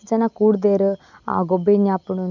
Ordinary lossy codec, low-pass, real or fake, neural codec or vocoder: none; 7.2 kHz; real; none